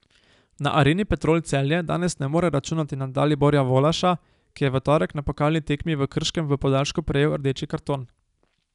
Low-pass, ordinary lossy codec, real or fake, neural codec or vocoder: 10.8 kHz; none; real; none